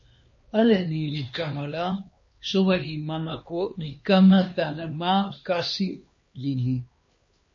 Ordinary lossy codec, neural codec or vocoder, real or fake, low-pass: MP3, 32 kbps; codec, 16 kHz, 2 kbps, X-Codec, HuBERT features, trained on LibriSpeech; fake; 7.2 kHz